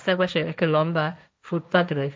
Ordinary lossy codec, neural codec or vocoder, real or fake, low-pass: none; codec, 16 kHz, 1.1 kbps, Voila-Tokenizer; fake; none